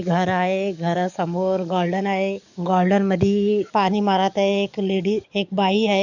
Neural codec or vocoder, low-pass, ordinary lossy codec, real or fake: codec, 44.1 kHz, 7.8 kbps, DAC; 7.2 kHz; none; fake